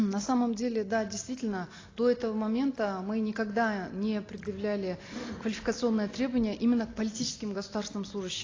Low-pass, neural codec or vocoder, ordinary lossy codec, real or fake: 7.2 kHz; none; AAC, 32 kbps; real